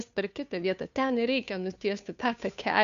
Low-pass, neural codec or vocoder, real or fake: 7.2 kHz; codec, 16 kHz, 2 kbps, FunCodec, trained on Chinese and English, 25 frames a second; fake